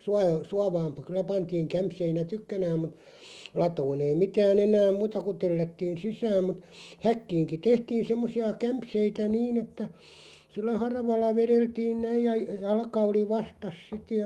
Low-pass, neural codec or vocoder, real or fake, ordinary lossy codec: 19.8 kHz; autoencoder, 48 kHz, 128 numbers a frame, DAC-VAE, trained on Japanese speech; fake; Opus, 32 kbps